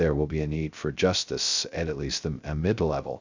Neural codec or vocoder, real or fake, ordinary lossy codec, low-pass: codec, 16 kHz, 0.2 kbps, FocalCodec; fake; Opus, 64 kbps; 7.2 kHz